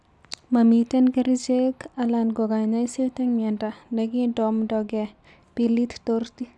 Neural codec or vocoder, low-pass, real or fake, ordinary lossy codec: none; none; real; none